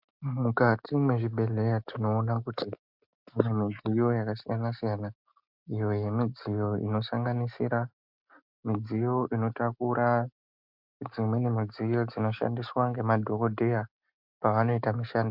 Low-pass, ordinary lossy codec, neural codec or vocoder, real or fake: 5.4 kHz; MP3, 48 kbps; none; real